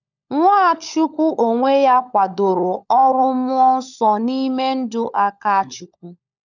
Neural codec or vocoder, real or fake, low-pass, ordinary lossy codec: codec, 16 kHz, 16 kbps, FunCodec, trained on LibriTTS, 50 frames a second; fake; 7.2 kHz; none